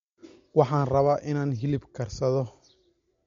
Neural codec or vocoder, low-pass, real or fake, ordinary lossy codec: none; 7.2 kHz; real; MP3, 48 kbps